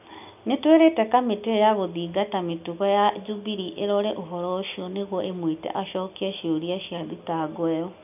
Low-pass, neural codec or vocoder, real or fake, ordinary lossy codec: 3.6 kHz; none; real; none